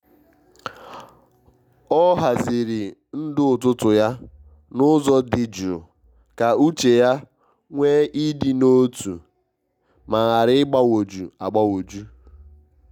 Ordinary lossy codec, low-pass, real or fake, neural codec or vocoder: none; 19.8 kHz; real; none